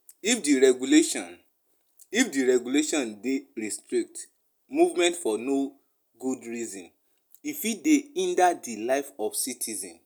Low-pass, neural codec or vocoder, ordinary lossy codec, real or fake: none; none; none; real